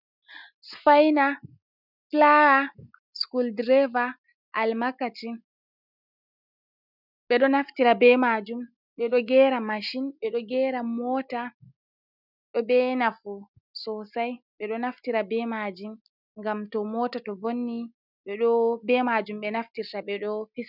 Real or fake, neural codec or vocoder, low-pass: real; none; 5.4 kHz